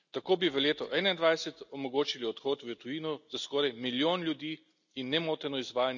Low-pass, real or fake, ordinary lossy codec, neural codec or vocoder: 7.2 kHz; real; none; none